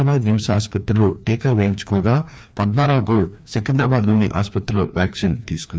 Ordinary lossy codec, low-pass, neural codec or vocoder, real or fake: none; none; codec, 16 kHz, 2 kbps, FreqCodec, larger model; fake